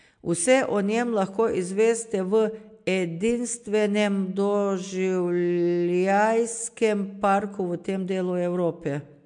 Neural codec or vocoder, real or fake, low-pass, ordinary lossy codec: none; real; 9.9 kHz; MP3, 64 kbps